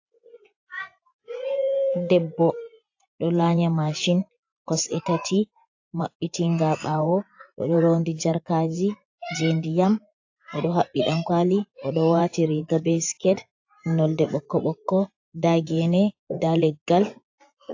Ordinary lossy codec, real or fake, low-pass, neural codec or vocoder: AAC, 48 kbps; fake; 7.2 kHz; vocoder, 24 kHz, 100 mel bands, Vocos